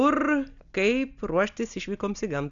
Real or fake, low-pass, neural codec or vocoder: real; 7.2 kHz; none